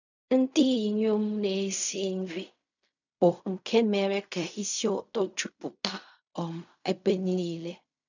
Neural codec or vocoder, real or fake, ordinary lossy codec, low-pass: codec, 16 kHz in and 24 kHz out, 0.4 kbps, LongCat-Audio-Codec, fine tuned four codebook decoder; fake; none; 7.2 kHz